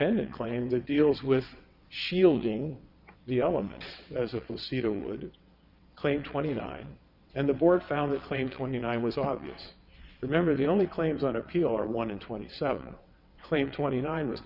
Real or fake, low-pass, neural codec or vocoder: fake; 5.4 kHz; vocoder, 22.05 kHz, 80 mel bands, WaveNeXt